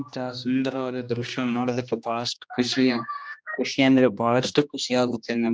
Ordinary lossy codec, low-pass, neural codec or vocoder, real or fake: none; none; codec, 16 kHz, 1 kbps, X-Codec, HuBERT features, trained on general audio; fake